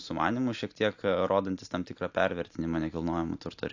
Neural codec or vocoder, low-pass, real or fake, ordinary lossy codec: none; 7.2 kHz; real; AAC, 48 kbps